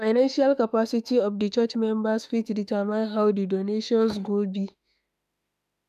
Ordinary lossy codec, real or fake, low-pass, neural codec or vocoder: none; fake; 14.4 kHz; autoencoder, 48 kHz, 32 numbers a frame, DAC-VAE, trained on Japanese speech